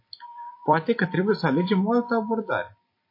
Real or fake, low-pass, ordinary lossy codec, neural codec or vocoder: fake; 5.4 kHz; MP3, 32 kbps; vocoder, 44.1 kHz, 128 mel bands every 256 samples, BigVGAN v2